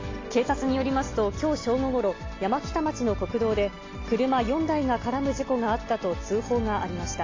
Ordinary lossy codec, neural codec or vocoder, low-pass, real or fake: AAC, 32 kbps; none; 7.2 kHz; real